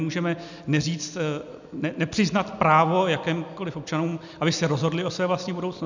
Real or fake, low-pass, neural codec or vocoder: real; 7.2 kHz; none